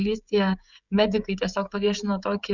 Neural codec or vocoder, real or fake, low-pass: codec, 16 kHz, 16 kbps, FreqCodec, smaller model; fake; 7.2 kHz